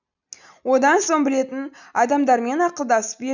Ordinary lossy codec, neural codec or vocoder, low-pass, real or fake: none; none; 7.2 kHz; real